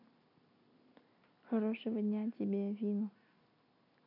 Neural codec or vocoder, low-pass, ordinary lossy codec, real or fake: none; 5.4 kHz; none; real